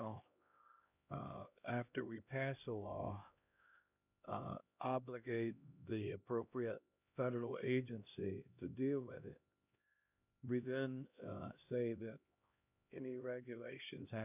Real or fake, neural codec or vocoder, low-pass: fake; codec, 16 kHz, 1 kbps, X-Codec, HuBERT features, trained on LibriSpeech; 3.6 kHz